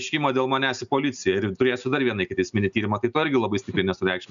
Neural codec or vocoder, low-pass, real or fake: none; 7.2 kHz; real